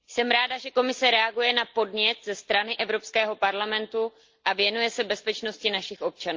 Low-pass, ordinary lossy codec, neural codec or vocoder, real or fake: 7.2 kHz; Opus, 32 kbps; none; real